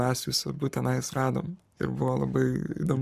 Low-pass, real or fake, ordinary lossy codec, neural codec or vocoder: 14.4 kHz; fake; Opus, 64 kbps; codec, 44.1 kHz, 7.8 kbps, Pupu-Codec